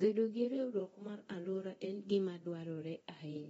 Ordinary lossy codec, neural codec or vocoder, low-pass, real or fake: AAC, 24 kbps; codec, 24 kHz, 0.9 kbps, DualCodec; 10.8 kHz; fake